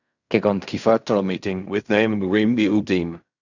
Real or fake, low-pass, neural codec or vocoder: fake; 7.2 kHz; codec, 16 kHz in and 24 kHz out, 0.4 kbps, LongCat-Audio-Codec, fine tuned four codebook decoder